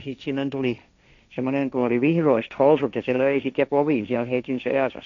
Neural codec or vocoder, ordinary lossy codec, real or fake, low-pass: codec, 16 kHz, 1.1 kbps, Voila-Tokenizer; none; fake; 7.2 kHz